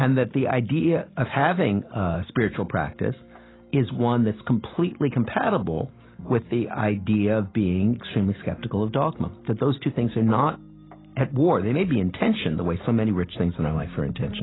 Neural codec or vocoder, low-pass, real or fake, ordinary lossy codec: none; 7.2 kHz; real; AAC, 16 kbps